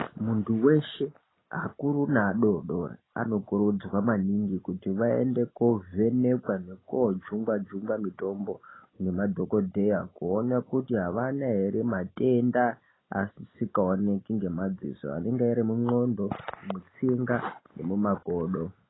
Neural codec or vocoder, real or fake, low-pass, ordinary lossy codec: none; real; 7.2 kHz; AAC, 16 kbps